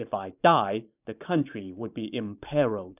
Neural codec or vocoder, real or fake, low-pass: vocoder, 44.1 kHz, 128 mel bands every 512 samples, BigVGAN v2; fake; 3.6 kHz